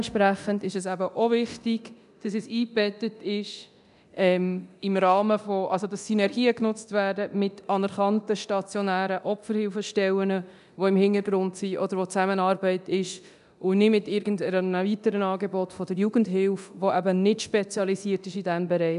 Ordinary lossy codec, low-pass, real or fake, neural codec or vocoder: none; 10.8 kHz; fake; codec, 24 kHz, 0.9 kbps, DualCodec